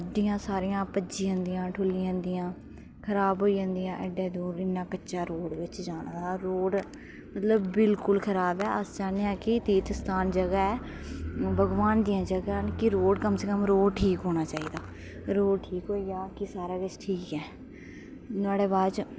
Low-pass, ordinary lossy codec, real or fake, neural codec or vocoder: none; none; real; none